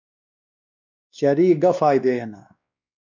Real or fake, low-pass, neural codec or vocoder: fake; 7.2 kHz; codec, 16 kHz, 2 kbps, X-Codec, WavLM features, trained on Multilingual LibriSpeech